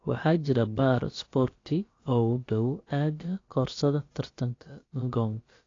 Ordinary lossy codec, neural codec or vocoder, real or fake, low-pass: AAC, 32 kbps; codec, 16 kHz, about 1 kbps, DyCAST, with the encoder's durations; fake; 7.2 kHz